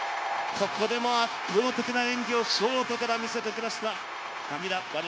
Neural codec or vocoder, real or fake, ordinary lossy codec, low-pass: codec, 16 kHz, 0.9 kbps, LongCat-Audio-Codec; fake; none; none